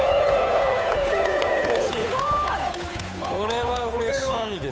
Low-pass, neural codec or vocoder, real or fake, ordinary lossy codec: none; codec, 16 kHz, 8 kbps, FunCodec, trained on Chinese and English, 25 frames a second; fake; none